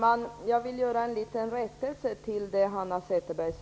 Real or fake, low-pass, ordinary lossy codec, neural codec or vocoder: real; none; none; none